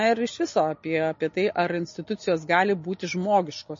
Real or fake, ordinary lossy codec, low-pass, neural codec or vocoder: real; MP3, 32 kbps; 7.2 kHz; none